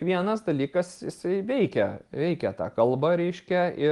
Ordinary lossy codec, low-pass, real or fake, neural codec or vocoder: Opus, 24 kbps; 10.8 kHz; real; none